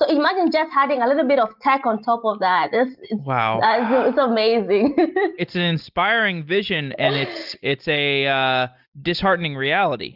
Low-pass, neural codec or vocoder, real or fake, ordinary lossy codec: 5.4 kHz; none; real; Opus, 32 kbps